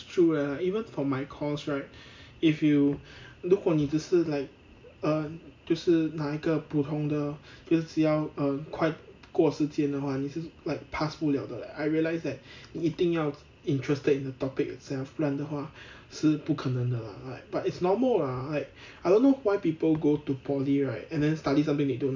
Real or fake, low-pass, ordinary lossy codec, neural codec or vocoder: real; 7.2 kHz; MP3, 64 kbps; none